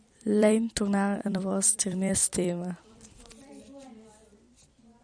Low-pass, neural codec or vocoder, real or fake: 9.9 kHz; vocoder, 44.1 kHz, 128 mel bands every 256 samples, BigVGAN v2; fake